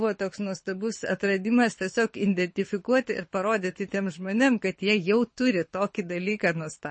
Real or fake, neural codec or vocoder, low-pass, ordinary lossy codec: fake; codec, 24 kHz, 6 kbps, HILCodec; 9.9 kHz; MP3, 32 kbps